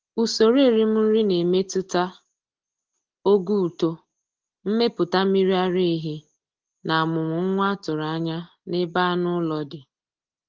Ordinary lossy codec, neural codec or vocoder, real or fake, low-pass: Opus, 16 kbps; none; real; 7.2 kHz